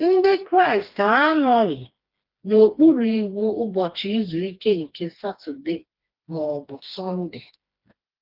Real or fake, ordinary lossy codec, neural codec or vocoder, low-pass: fake; Opus, 32 kbps; codec, 16 kHz, 2 kbps, FreqCodec, smaller model; 5.4 kHz